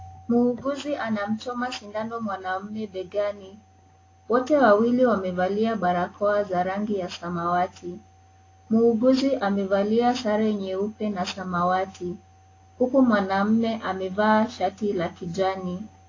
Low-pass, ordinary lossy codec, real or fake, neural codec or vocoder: 7.2 kHz; AAC, 32 kbps; real; none